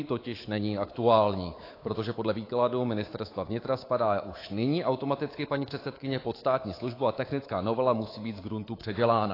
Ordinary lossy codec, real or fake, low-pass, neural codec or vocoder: AAC, 24 kbps; fake; 5.4 kHz; codec, 24 kHz, 3.1 kbps, DualCodec